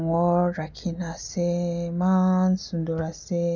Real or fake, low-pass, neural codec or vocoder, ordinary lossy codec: real; 7.2 kHz; none; none